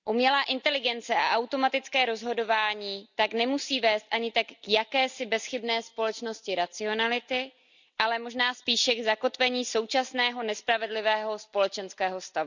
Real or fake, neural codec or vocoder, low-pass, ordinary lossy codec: real; none; 7.2 kHz; none